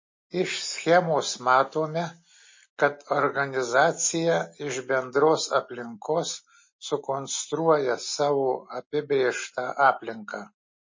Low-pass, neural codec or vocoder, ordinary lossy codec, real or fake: 7.2 kHz; none; MP3, 32 kbps; real